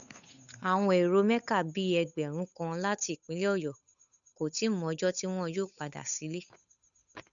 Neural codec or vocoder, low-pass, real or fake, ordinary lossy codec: codec, 16 kHz, 8 kbps, FunCodec, trained on Chinese and English, 25 frames a second; 7.2 kHz; fake; none